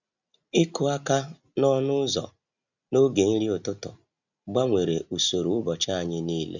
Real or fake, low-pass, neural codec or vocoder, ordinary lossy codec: real; 7.2 kHz; none; none